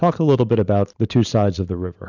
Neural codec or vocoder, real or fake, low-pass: vocoder, 44.1 kHz, 128 mel bands every 512 samples, BigVGAN v2; fake; 7.2 kHz